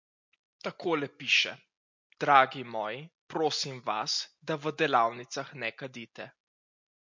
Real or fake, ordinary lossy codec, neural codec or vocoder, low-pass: real; MP3, 48 kbps; none; 7.2 kHz